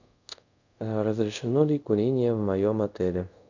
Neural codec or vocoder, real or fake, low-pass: codec, 24 kHz, 0.5 kbps, DualCodec; fake; 7.2 kHz